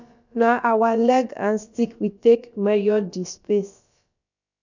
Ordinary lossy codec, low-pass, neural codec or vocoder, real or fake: none; 7.2 kHz; codec, 16 kHz, about 1 kbps, DyCAST, with the encoder's durations; fake